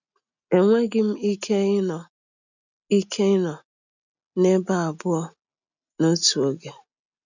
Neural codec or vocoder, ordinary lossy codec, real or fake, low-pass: none; none; real; 7.2 kHz